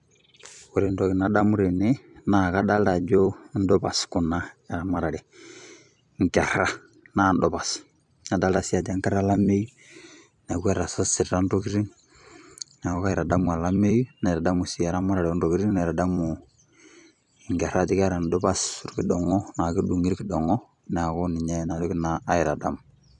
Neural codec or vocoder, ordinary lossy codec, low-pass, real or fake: vocoder, 44.1 kHz, 128 mel bands every 256 samples, BigVGAN v2; none; 10.8 kHz; fake